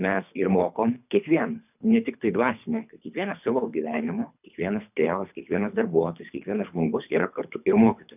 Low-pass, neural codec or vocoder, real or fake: 3.6 kHz; codec, 24 kHz, 3 kbps, HILCodec; fake